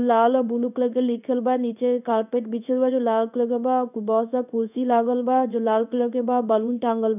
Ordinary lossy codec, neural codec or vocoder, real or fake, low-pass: none; codec, 16 kHz in and 24 kHz out, 1 kbps, XY-Tokenizer; fake; 3.6 kHz